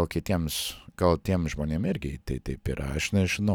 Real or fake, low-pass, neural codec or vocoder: real; 19.8 kHz; none